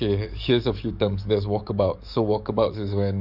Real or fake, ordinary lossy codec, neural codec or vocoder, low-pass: fake; none; codec, 44.1 kHz, 7.8 kbps, DAC; 5.4 kHz